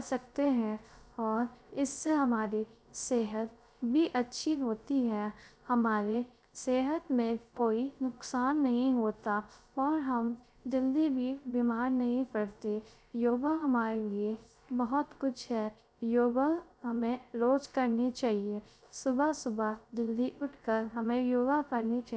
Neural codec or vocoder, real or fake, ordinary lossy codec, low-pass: codec, 16 kHz, 0.3 kbps, FocalCodec; fake; none; none